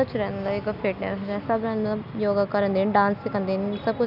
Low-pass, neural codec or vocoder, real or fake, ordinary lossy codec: 5.4 kHz; none; real; none